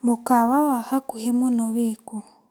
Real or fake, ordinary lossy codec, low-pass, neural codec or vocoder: fake; none; none; codec, 44.1 kHz, 7.8 kbps, DAC